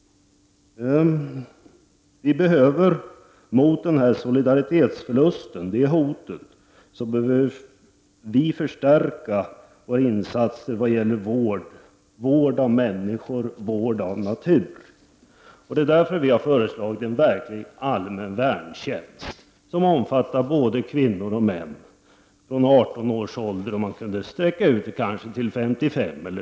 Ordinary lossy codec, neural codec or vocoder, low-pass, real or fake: none; none; none; real